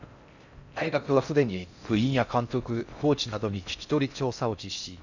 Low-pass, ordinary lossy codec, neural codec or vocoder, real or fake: 7.2 kHz; none; codec, 16 kHz in and 24 kHz out, 0.6 kbps, FocalCodec, streaming, 4096 codes; fake